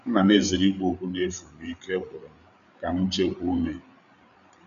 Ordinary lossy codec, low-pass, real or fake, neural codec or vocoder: none; 7.2 kHz; fake; codec, 16 kHz, 8 kbps, FreqCodec, larger model